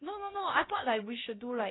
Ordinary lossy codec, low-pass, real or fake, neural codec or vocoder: AAC, 16 kbps; 7.2 kHz; fake; codec, 16 kHz, 0.3 kbps, FocalCodec